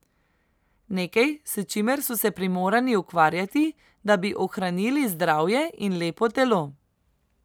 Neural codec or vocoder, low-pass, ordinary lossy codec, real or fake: none; none; none; real